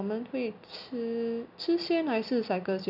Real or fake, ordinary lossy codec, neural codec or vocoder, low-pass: real; none; none; 5.4 kHz